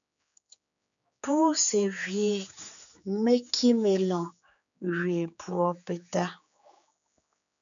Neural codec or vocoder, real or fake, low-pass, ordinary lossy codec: codec, 16 kHz, 4 kbps, X-Codec, HuBERT features, trained on general audio; fake; 7.2 kHz; MP3, 64 kbps